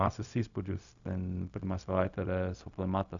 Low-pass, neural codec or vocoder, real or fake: 7.2 kHz; codec, 16 kHz, 0.4 kbps, LongCat-Audio-Codec; fake